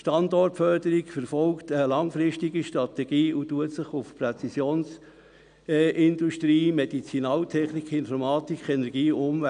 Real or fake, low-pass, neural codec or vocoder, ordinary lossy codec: real; 9.9 kHz; none; none